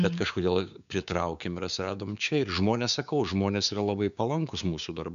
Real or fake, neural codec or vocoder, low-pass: fake; codec, 16 kHz, 6 kbps, DAC; 7.2 kHz